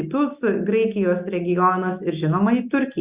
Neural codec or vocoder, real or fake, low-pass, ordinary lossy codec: none; real; 3.6 kHz; Opus, 64 kbps